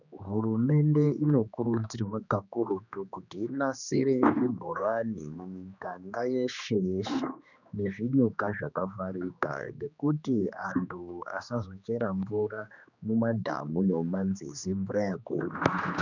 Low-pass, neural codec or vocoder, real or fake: 7.2 kHz; codec, 16 kHz, 2 kbps, X-Codec, HuBERT features, trained on general audio; fake